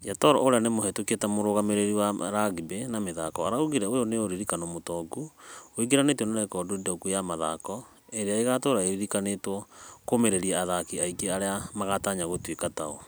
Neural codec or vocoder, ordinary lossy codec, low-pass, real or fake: none; none; none; real